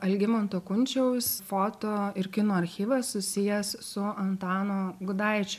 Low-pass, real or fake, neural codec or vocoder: 14.4 kHz; real; none